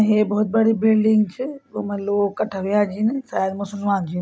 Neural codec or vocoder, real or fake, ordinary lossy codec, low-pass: none; real; none; none